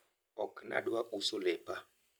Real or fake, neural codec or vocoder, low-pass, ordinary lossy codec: real; none; none; none